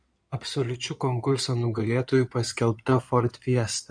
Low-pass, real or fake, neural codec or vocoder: 9.9 kHz; fake; codec, 16 kHz in and 24 kHz out, 2.2 kbps, FireRedTTS-2 codec